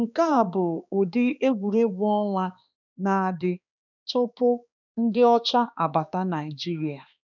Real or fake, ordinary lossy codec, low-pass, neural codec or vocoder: fake; none; 7.2 kHz; codec, 16 kHz, 2 kbps, X-Codec, HuBERT features, trained on balanced general audio